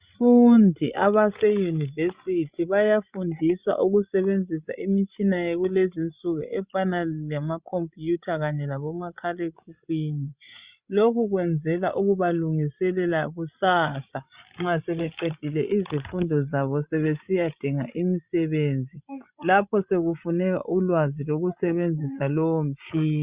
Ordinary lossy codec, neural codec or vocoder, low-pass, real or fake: Opus, 64 kbps; codec, 16 kHz, 16 kbps, FreqCodec, larger model; 3.6 kHz; fake